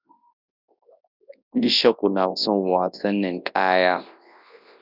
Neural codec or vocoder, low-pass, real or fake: codec, 24 kHz, 0.9 kbps, WavTokenizer, large speech release; 5.4 kHz; fake